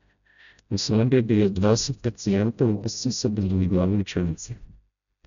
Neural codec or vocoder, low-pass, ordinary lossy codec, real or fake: codec, 16 kHz, 0.5 kbps, FreqCodec, smaller model; 7.2 kHz; none; fake